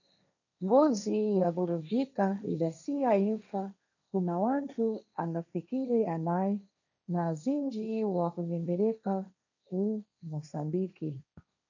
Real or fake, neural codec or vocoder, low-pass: fake; codec, 16 kHz, 1.1 kbps, Voila-Tokenizer; 7.2 kHz